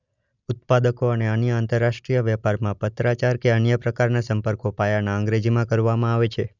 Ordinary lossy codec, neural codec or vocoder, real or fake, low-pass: none; none; real; 7.2 kHz